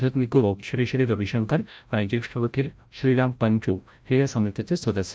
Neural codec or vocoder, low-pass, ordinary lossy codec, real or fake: codec, 16 kHz, 0.5 kbps, FreqCodec, larger model; none; none; fake